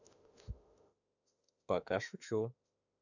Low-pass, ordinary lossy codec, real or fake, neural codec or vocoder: 7.2 kHz; none; fake; autoencoder, 48 kHz, 32 numbers a frame, DAC-VAE, trained on Japanese speech